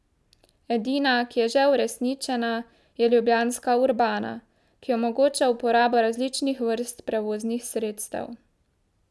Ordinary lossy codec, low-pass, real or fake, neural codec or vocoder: none; none; real; none